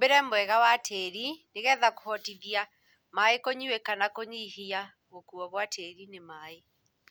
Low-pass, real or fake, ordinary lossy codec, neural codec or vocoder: none; real; none; none